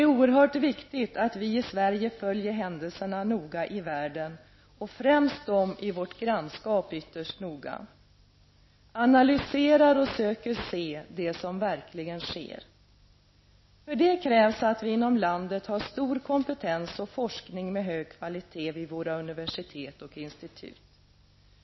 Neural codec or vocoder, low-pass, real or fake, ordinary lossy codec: none; 7.2 kHz; real; MP3, 24 kbps